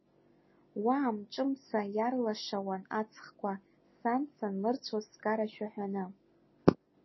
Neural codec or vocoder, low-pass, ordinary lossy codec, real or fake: none; 7.2 kHz; MP3, 24 kbps; real